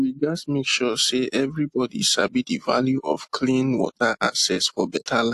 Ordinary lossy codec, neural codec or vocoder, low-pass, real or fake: AAC, 64 kbps; none; 14.4 kHz; real